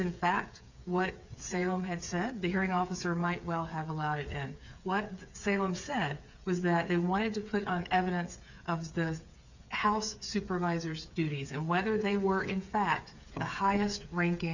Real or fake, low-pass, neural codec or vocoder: fake; 7.2 kHz; codec, 16 kHz, 8 kbps, FreqCodec, smaller model